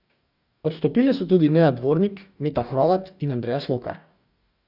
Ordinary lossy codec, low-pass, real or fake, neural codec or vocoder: none; 5.4 kHz; fake; codec, 44.1 kHz, 2.6 kbps, DAC